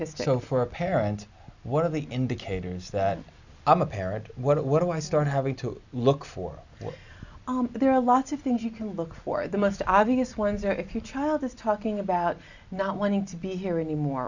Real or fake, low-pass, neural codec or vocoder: real; 7.2 kHz; none